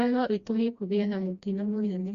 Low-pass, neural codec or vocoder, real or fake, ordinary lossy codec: 7.2 kHz; codec, 16 kHz, 1 kbps, FreqCodec, smaller model; fake; Opus, 64 kbps